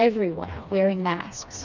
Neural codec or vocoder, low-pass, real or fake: codec, 16 kHz, 2 kbps, FreqCodec, smaller model; 7.2 kHz; fake